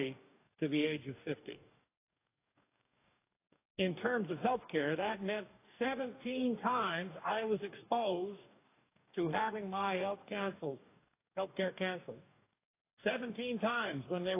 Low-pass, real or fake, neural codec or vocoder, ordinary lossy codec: 3.6 kHz; fake; codec, 44.1 kHz, 2.6 kbps, DAC; AAC, 24 kbps